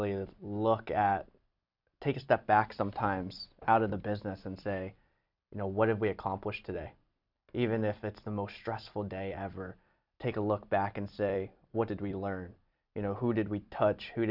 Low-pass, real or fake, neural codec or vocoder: 5.4 kHz; fake; vocoder, 44.1 kHz, 128 mel bands every 256 samples, BigVGAN v2